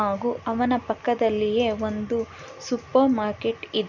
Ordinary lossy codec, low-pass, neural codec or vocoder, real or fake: none; 7.2 kHz; none; real